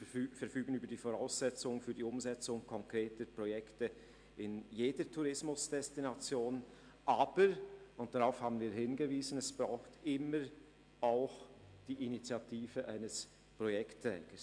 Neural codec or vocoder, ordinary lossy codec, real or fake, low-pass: none; MP3, 64 kbps; real; 9.9 kHz